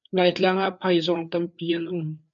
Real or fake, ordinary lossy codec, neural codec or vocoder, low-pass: fake; MP3, 64 kbps; codec, 16 kHz, 4 kbps, FreqCodec, larger model; 7.2 kHz